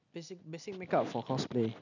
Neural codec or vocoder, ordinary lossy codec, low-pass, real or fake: none; none; 7.2 kHz; real